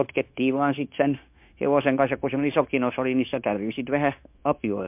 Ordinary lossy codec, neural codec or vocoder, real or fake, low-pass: MP3, 32 kbps; codec, 16 kHz, 0.9 kbps, LongCat-Audio-Codec; fake; 3.6 kHz